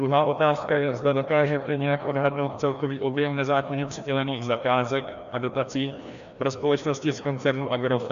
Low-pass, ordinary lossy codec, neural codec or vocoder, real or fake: 7.2 kHz; AAC, 96 kbps; codec, 16 kHz, 1 kbps, FreqCodec, larger model; fake